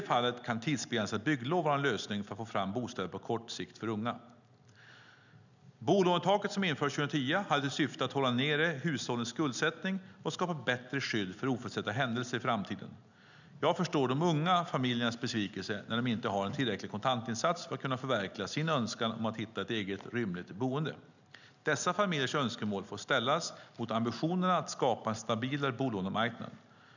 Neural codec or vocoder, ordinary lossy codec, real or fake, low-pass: none; none; real; 7.2 kHz